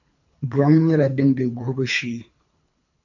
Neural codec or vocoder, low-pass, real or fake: codec, 24 kHz, 3 kbps, HILCodec; 7.2 kHz; fake